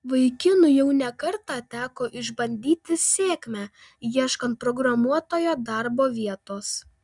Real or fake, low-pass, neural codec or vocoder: real; 10.8 kHz; none